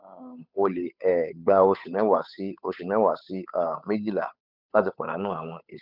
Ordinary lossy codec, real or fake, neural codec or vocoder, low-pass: none; fake; codec, 16 kHz, 8 kbps, FunCodec, trained on Chinese and English, 25 frames a second; 5.4 kHz